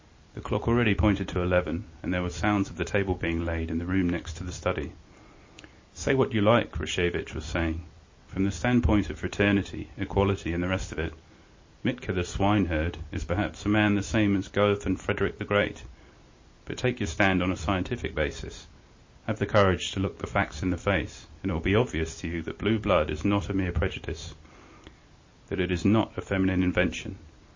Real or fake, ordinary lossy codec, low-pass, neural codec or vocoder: real; MP3, 32 kbps; 7.2 kHz; none